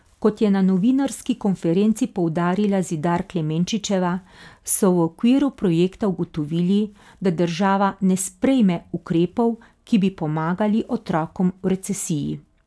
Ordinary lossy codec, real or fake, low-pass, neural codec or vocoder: none; real; none; none